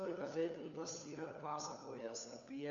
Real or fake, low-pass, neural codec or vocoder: fake; 7.2 kHz; codec, 16 kHz, 4 kbps, FunCodec, trained on LibriTTS, 50 frames a second